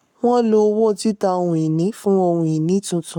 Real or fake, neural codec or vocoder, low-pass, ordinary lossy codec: fake; codec, 44.1 kHz, 7.8 kbps, Pupu-Codec; 19.8 kHz; none